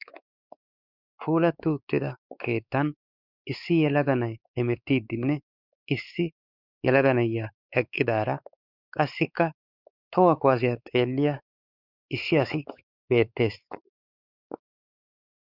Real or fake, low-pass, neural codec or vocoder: fake; 5.4 kHz; codec, 16 kHz, 4 kbps, X-Codec, WavLM features, trained on Multilingual LibriSpeech